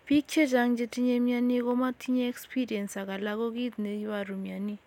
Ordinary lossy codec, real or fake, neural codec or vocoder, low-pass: none; real; none; 19.8 kHz